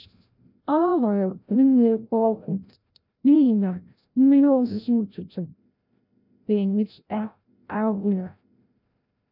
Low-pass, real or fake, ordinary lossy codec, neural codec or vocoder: 5.4 kHz; fake; none; codec, 16 kHz, 0.5 kbps, FreqCodec, larger model